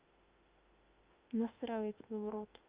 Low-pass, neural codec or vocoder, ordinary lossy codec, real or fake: 3.6 kHz; autoencoder, 48 kHz, 32 numbers a frame, DAC-VAE, trained on Japanese speech; Opus, 24 kbps; fake